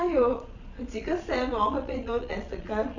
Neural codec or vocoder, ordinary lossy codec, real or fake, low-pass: vocoder, 22.05 kHz, 80 mel bands, Vocos; none; fake; 7.2 kHz